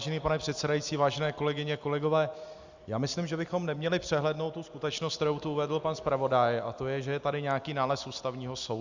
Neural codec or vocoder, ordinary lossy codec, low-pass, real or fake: none; Opus, 64 kbps; 7.2 kHz; real